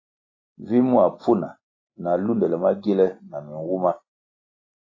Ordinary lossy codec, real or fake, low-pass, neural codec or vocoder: AAC, 32 kbps; real; 7.2 kHz; none